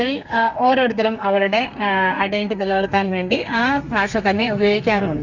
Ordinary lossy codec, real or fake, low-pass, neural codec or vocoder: Opus, 64 kbps; fake; 7.2 kHz; codec, 32 kHz, 1.9 kbps, SNAC